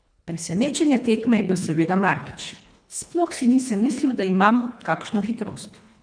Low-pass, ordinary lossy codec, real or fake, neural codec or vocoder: 9.9 kHz; none; fake; codec, 24 kHz, 1.5 kbps, HILCodec